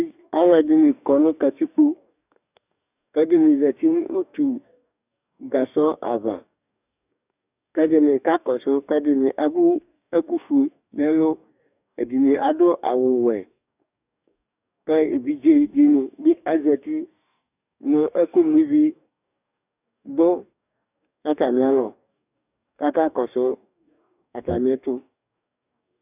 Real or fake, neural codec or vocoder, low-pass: fake; codec, 44.1 kHz, 2.6 kbps, DAC; 3.6 kHz